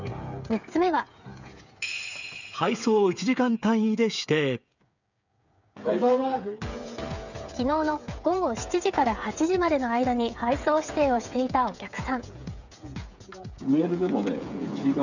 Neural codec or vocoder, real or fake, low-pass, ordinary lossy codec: codec, 16 kHz, 8 kbps, FreqCodec, smaller model; fake; 7.2 kHz; none